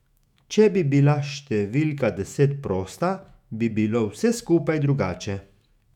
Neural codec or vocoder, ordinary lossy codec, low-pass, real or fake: autoencoder, 48 kHz, 128 numbers a frame, DAC-VAE, trained on Japanese speech; none; 19.8 kHz; fake